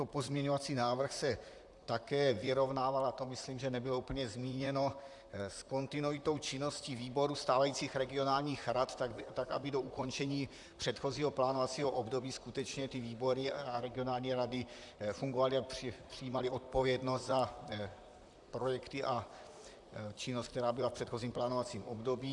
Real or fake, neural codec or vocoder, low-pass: fake; vocoder, 44.1 kHz, 128 mel bands, Pupu-Vocoder; 10.8 kHz